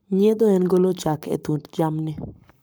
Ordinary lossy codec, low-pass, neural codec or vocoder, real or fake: none; none; codec, 44.1 kHz, 7.8 kbps, Pupu-Codec; fake